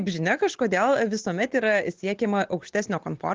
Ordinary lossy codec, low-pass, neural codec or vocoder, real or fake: Opus, 16 kbps; 7.2 kHz; none; real